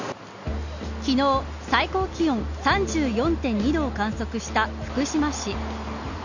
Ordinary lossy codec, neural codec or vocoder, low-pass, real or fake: none; none; 7.2 kHz; real